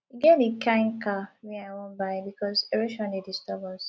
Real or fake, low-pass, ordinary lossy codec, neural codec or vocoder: real; none; none; none